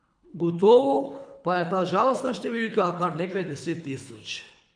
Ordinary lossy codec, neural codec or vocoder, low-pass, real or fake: none; codec, 24 kHz, 3 kbps, HILCodec; 9.9 kHz; fake